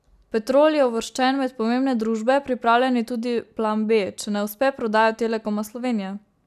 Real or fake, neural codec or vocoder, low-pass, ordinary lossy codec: real; none; 14.4 kHz; none